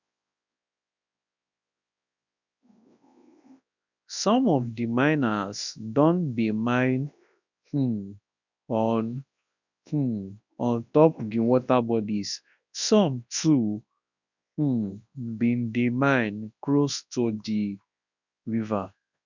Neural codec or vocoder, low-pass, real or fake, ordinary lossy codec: codec, 24 kHz, 0.9 kbps, WavTokenizer, large speech release; 7.2 kHz; fake; none